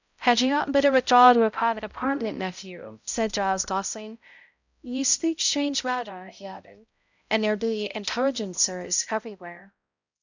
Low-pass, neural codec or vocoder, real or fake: 7.2 kHz; codec, 16 kHz, 0.5 kbps, X-Codec, HuBERT features, trained on balanced general audio; fake